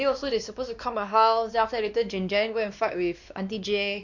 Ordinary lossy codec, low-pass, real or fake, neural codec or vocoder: none; 7.2 kHz; fake; codec, 16 kHz, 2 kbps, X-Codec, WavLM features, trained on Multilingual LibriSpeech